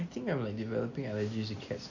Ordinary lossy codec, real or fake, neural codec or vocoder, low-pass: none; real; none; 7.2 kHz